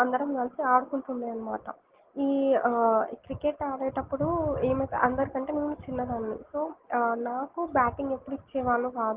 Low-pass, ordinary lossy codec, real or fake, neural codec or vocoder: 3.6 kHz; Opus, 16 kbps; real; none